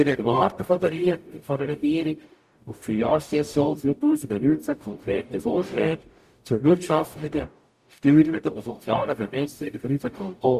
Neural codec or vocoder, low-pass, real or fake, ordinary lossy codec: codec, 44.1 kHz, 0.9 kbps, DAC; 14.4 kHz; fake; Opus, 64 kbps